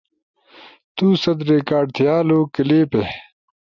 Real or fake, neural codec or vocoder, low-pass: real; none; 7.2 kHz